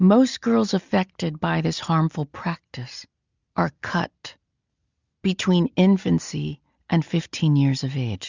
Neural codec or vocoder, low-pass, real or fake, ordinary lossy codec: none; 7.2 kHz; real; Opus, 64 kbps